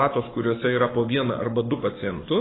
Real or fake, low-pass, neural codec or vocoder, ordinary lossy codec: fake; 7.2 kHz; codec, 44.1 kHz, 7.8 kbps, Pupu-Codec; AAC, 16 kbps